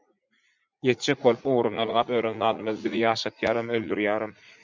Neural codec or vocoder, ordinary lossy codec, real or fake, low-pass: vocoder, 44.1 kHz, 80 mel bands, Vocos; MP3, 64 kbps; fake; 7.2 kHz